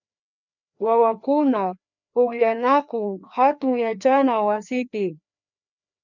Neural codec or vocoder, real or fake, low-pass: codec, 16 kHz, 2 kbps, FreqCodec, larger model; fake; 7.2 kHz